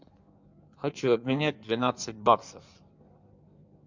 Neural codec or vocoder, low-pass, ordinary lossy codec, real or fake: codec, 16 kHz in and 24 kHz out, 1.1 kbps, FireRedTTS-2 codec; 7.2 kHz; MP3, 64 kbps; fake